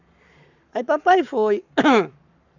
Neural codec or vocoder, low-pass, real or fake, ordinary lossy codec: codec, 44.1 kHz, 7.8 kbps, Pupu-Codec; 7.2 kHz; fake; none